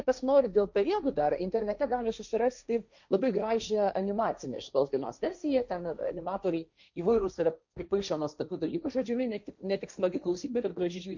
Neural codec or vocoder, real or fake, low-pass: codec, 16 kHz, 1.1 kbps, Voila-Tokenizer; fake; 7.2 kHz